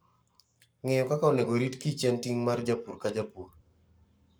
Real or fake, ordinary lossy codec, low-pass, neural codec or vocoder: fake; none; none; codec, 44.1 kHz, 7.8 kbps, Pupu-Codec